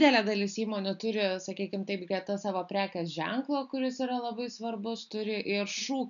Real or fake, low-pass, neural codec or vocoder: real; 7.2 kHz; none